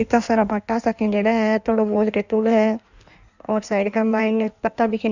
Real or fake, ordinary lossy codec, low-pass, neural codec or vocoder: fake; none; 7.2 kHz; codec, 16 kHz in and 24 kHz out, 1.1 kbps, FireRedTTS-2 codec